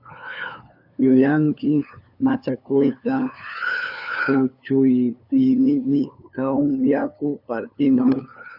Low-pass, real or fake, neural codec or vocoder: 5.4 kHz; fake; codec, 16 kHz, 2 kbps, FunCodec, trained on LibriTTS, 25 frames a second